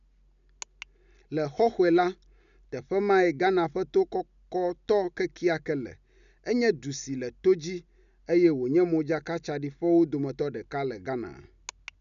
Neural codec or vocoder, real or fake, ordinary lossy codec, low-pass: none; real; none; 7.2 kHz